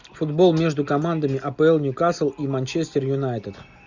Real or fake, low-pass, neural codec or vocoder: real; 7.2 kHz; none